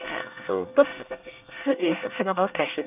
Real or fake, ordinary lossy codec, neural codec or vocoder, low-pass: fake; none; codec, 24 kHz, 1 kbps, SNAC; 3.6 kHz